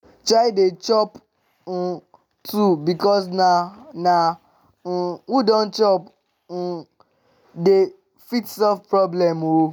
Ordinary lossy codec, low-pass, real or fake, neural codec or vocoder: none; 19.8 kHz; real; none